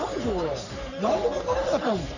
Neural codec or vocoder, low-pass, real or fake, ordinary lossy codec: codec, 44.1 kHz, 3.4 kbps, Pupu-Codec; 7.2 kHz; fake; none